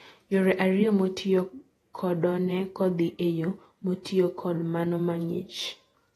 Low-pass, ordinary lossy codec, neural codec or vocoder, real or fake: 19.8 kHz; AAC, 32 kbps; vocoder, 48 kHz, 128 mel bands, Vocos; fake